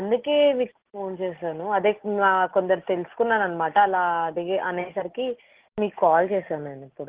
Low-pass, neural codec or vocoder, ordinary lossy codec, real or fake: 3.6 kHz; none; Opus, 16 kbps; real